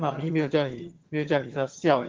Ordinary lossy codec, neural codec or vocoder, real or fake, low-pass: Opus, 16 kbps; vocoder, 22.05 kHz, 80 mel bands, HiFi-GAN; fake; 7.2 kHz